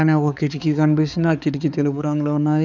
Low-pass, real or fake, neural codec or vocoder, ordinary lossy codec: 7.2 kHz; fake; codec, 16 kHz, 4 kbps, X-Codec, HuBERT features, trained on balanced general audio; none